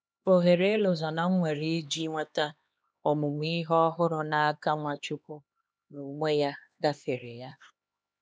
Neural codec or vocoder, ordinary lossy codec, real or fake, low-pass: codec, 16 kHz, 2 kbps, X-Codec, HuBERT features, trained on LibriSpeech; none; fake; none